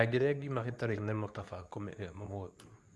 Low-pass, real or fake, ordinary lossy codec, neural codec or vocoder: none; fake; none; codec, 24 kHz, 0.9 kbps, WavTokenizer, medium speech release version 2